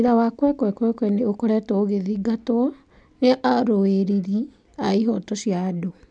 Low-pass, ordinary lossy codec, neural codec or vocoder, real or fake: 9.9 kHz; none; none; real